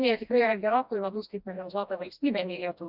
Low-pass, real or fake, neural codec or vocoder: 5.4 kHz; fake; codec, 16 kHz, 1 kbps, FreqCodec, smaller model